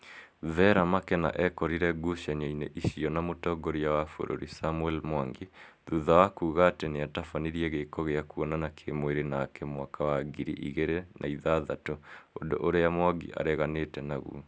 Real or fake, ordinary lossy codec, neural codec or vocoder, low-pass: real; none; none; none